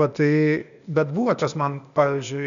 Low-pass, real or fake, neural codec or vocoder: 7.2 kHz; fake; codec, 16 kHz, 0.8 kbps, ZipCodec